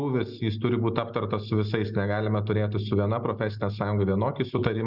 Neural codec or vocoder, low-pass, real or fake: none; 5.4 kHz; real